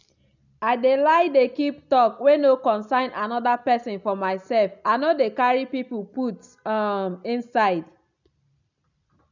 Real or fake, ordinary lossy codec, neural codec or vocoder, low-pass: real; none; none; 7.2 kHz